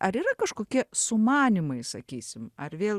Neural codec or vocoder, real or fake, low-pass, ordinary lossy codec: none; real; 14.4 kHz; Opus, 64 kbps